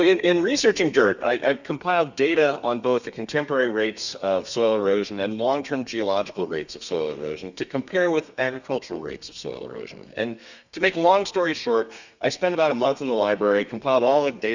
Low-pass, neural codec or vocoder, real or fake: 7.2 kHz; codec, 32 kHz, 1.9 kbps, SNAC; fake